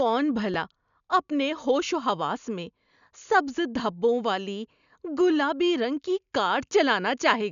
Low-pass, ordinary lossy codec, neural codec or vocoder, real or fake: 7.2 kHz; none; none; real